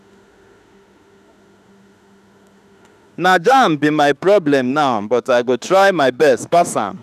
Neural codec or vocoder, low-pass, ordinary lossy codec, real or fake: autoencoder, 48 kHz, 32 numbers a frame, DAC-VAE, trained on Japanese speech; 14.4 kHz; none; fake